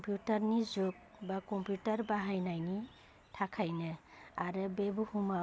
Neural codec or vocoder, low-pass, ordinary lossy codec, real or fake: none; none; none; real